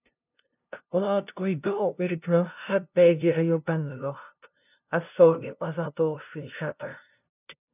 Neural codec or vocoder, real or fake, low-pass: codec, 16 kHz, 0.5 kbps, FunCodec, trained on LibriTTS, 25 frames a second; fake; 3.6 kHz